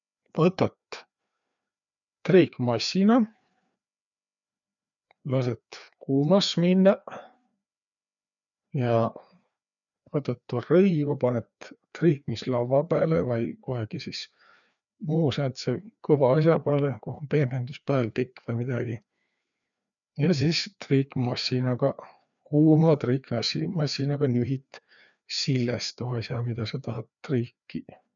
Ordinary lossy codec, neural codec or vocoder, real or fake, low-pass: MP3, 96 kbps; codec, 16 kHz, 2 kbps, FreqCodec, larger model; fake; 7.2 kHz